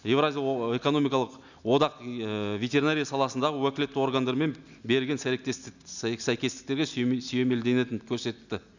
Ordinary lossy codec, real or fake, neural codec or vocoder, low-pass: none; real; none; 7.2 kHz